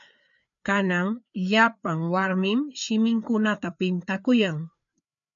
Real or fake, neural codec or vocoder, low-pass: fake; codec, 16 kHz, 4 kbps, FreqCodec, larger model; 7.2 kHz